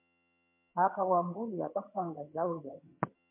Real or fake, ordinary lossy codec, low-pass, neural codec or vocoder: fake; MP3, 32 kbps; 3.6 kHz; vocoder, 22.05 kHz, 80 mel bands, HiFi-GAN